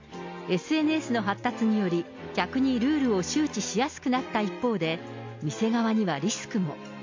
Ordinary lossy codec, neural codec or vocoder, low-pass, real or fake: MP3, 48 kbps; none; 7.2 kHz; real